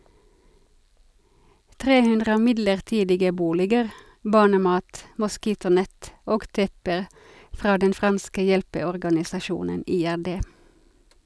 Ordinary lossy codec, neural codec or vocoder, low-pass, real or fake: none; none; none; real